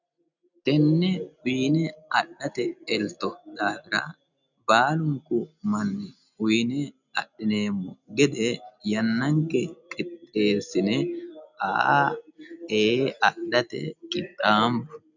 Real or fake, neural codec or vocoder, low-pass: real; none; 7.2 kHz